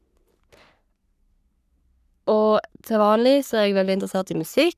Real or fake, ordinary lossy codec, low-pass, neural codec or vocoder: fake; none; 14.4 kHz; codec, 44.1 kHz, 3.4 kbps, Pupu-Codec